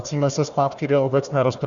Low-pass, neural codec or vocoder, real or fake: 7.2 kHz; codec, 16 kHz, 1 kbps, FunCodec, trained on Chinese and English, 50 frames a second; fake